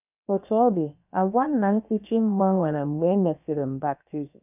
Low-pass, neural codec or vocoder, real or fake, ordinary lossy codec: 3.6 kHz; codec, 16 kHz, about 1 kbps, DyCAST, with the encoder's durations; fake; none